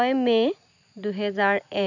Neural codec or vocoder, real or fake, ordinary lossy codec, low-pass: none; real; none; 7.2 kHz